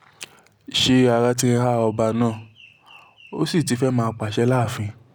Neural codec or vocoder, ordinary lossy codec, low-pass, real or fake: none; none; none; real